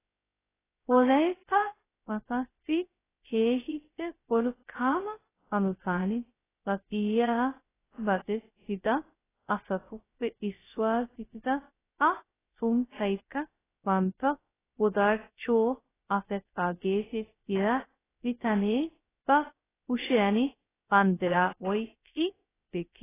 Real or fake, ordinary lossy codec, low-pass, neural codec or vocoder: fake; AAC, 16 kbps; 3.6 kHz; codec, 16 kHz, 0.2 kbps, FocalCodec